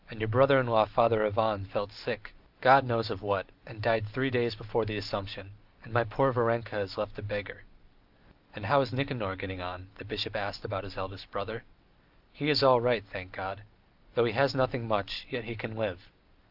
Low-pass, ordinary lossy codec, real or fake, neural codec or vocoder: 5.4 kHz; Opus, 32 kbps; real; none